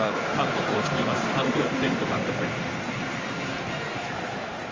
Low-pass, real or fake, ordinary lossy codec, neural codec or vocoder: 7.2 kHz; fake; Opus, 32 kbps; codec, 16 kHz in and 24 kHz out, 1 kbps, XY-Tokenizer